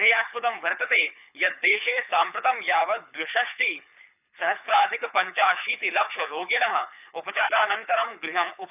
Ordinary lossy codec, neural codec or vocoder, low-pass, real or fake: none; codec, 24 kHz, 6 kbps, HILCodec; 3.6 kHz; fake